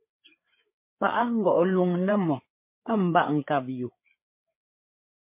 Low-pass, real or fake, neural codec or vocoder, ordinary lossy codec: 3.6 kHz; fake; codec, 16 kHz, 4 kbps, FreqCodec, larger model; MP3, 24 kbps